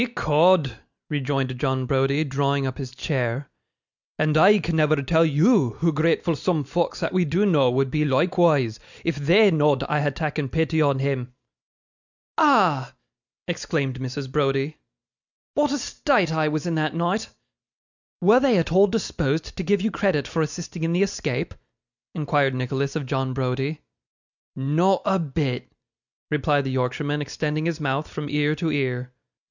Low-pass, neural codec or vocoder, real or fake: 7.2 kHz; none; real